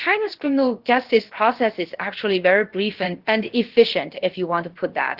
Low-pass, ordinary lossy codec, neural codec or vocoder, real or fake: 5.4 kHz; Opus, 16 kbps; codec, 16 kHz, about 1 kbps, DyCAST, with the encoder's durations; fake